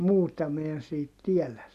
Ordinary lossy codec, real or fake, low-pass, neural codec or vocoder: none; real; 14.4 kHz; none